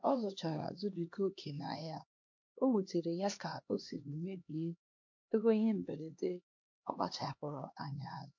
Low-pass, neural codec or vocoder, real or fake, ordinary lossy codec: 7.2 kHz; codec, 16 kHz, 2 kbps, X-Codec, HuBERT features, trained on LibriSpeech; fake; MP3, 48 kbps